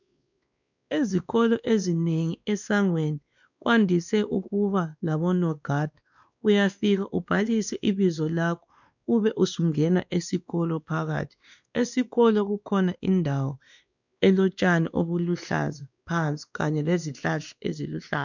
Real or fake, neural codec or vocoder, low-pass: fake; codec, 16 kHz, 2 kbps, X-Codec, WavLM features, trained on Multilingual LibriSpeech; 7.2 kHz